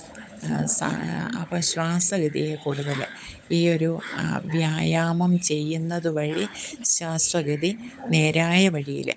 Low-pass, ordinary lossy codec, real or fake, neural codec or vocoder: none; none; fake; codec, 16 kHz, 16 kbps, FunCodec, trained on LibriTTS, 50 frames a second